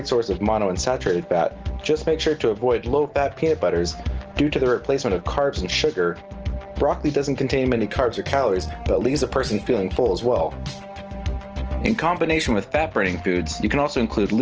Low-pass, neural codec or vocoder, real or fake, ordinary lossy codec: 7.2 kHz; none; real; Opus, 16 kbps